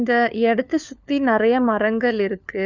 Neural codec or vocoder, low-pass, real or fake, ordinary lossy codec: codec, 16 kHz, 4 kbps, FunCodec, trained on LibriTTS, 50 frames a second; 7.2 kHz; fake; none